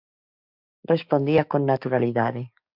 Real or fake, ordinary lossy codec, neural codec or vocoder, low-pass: fake; MP3, 48 kbps; codec, 16 kHz, 6 kbps, DAC; 5.4 kHz